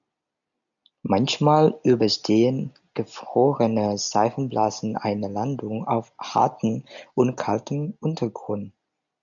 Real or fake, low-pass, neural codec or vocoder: real; 7.2 kHz; none